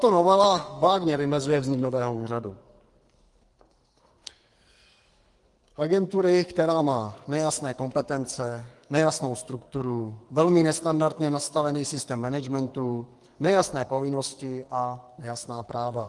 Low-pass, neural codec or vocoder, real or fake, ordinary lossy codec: 10.8 kHz; codec, 44.1 kHz, 2.6 kbps, SNAC; fake; Opus, 24 kbps